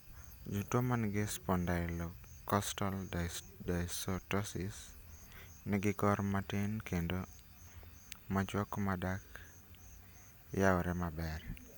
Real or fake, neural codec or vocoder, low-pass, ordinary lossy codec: real; none; none; none